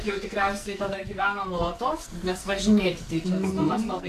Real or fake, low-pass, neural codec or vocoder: fake; 14.4 kHz; codec, 44.1 kHz, 2.6 kbps, SNAC